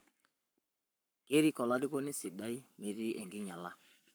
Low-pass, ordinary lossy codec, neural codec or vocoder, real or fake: none; none; codec, 44.1 kHz, 7.8 kbps, Pupu-Codec; fake